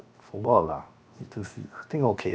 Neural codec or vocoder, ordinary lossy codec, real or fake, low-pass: codec, 16 kHz, 0.7 kbps, FocalCodec; none; fake; none